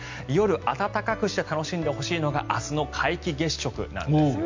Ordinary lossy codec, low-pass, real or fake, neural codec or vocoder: MP3, 64 kbps; 7.2 kHz; real; none